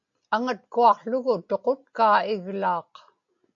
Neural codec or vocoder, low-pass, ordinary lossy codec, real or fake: none; 7.2 kHz; AAC, 64 kbps; real